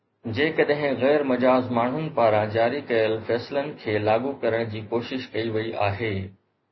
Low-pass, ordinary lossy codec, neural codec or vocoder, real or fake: 7.2 kHz; MP3, 24 kbps; none; real